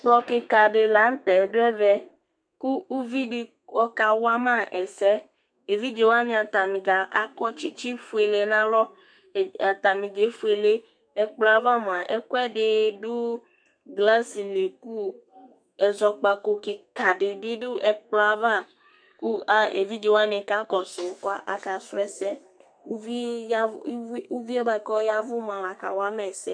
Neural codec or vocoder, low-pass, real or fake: codec, 32 kHz, 1.9 kbps, SNAC; 9.9 kHz; fake